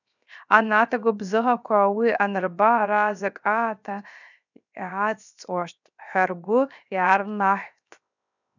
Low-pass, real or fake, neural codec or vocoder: 7.2 kHz; fake; codec, 16 kHz, 0.7 kbps, FocalCodec